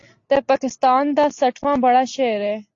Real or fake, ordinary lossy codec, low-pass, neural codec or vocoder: real; AAC, 48 kbps; 7.2 kHz; none